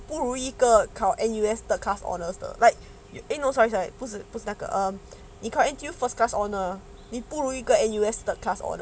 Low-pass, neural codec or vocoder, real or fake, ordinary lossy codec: none; none; real; none